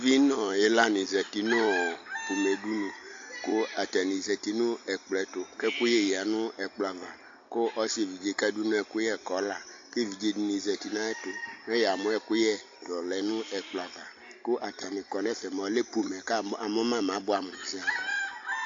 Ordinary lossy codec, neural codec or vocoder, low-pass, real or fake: AAC, 48 kbps; none; 7.2 kHz; real